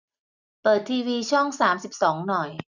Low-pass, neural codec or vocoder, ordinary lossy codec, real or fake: 7.2 kHz; none; none; real